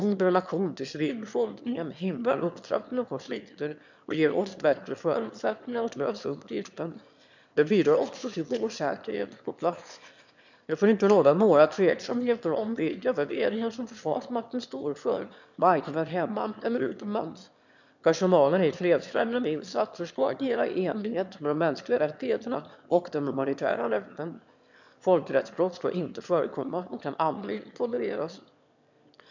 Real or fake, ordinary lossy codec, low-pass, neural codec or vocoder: fake; none; 7.2 kHz; autoencoder, 22.05 kHz, a latent of 192 numbers a frame, VITS, trained on one speaker